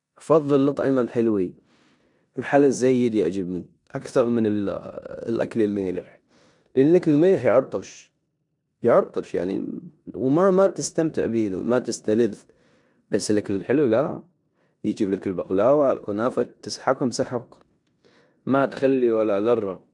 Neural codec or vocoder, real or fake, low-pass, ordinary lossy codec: codec, 16 kHz in and 24 kHz out, 0.9 kbps, LongCat-Audio-Codec, four codebook decoder; fake; 10.8 kHz; none